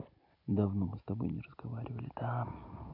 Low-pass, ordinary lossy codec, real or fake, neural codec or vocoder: 5.4 kHz; MP3, 48 kbps; real; none